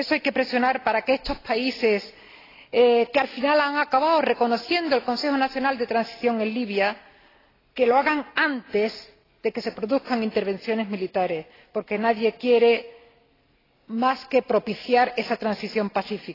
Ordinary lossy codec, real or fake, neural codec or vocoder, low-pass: AAC, 24 kbps; real; none; 5.4 kHz